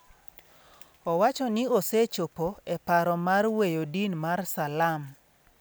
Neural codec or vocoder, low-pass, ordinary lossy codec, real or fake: none; none; none; real